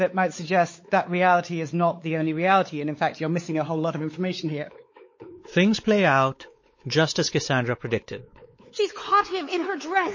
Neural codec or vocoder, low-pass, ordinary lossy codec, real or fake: codec, 16 kHz, 4 kbps, X-Codec, WavLM features, trained on Multilingual LibriSpeech; 7.2 kHz; MP3, 32 kbps; fake